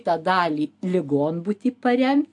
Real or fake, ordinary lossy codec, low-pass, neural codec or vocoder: real; AAC, 64 kbps; 10.8 kHz; none